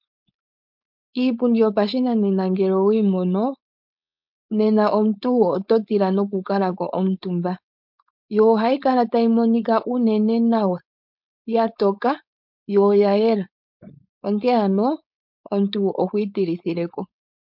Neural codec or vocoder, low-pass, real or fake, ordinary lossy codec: codec, 16 kHz, 4.8 kbps, FACodec; 5.4 kHz; fake; MP3, 48 kbps